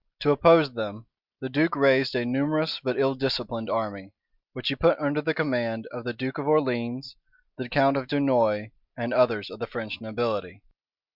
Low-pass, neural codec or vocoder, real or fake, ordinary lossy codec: 5.4 kHz; none; real; Opus, 64 kbps